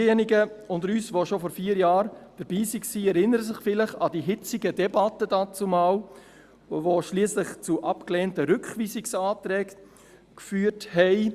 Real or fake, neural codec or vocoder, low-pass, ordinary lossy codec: real; none; 14.4 kHz; Opus, 64 kbps